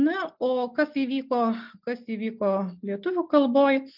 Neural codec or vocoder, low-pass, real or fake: none; 5.4 kHz; real